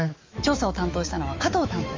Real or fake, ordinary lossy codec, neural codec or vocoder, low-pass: fake; Opus, 32 kbps; autoencoder, 48 kHz, 128 numbers a frame, DAC-VAE, trained on Japanese speech; 7.2 kHz